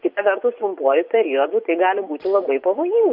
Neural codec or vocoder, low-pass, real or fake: vocoder, 24 kHz, 100 mel bands, Vocos; 5.4 kHz; fake